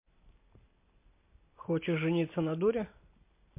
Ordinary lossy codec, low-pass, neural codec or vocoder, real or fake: MP3, 24 kbps; 3.6 kHz; none; real